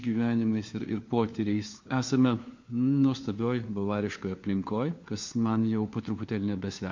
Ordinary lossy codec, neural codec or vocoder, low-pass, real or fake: MP3, 48 kbps; codec, 16 kHz, 4 kbps, FunCodec, trained on LibriTTS, 50 frames a second; 7.2 kHz; fake